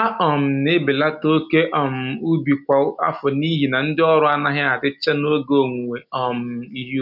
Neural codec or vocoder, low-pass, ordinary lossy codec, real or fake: none; 5.4 kHz; none; real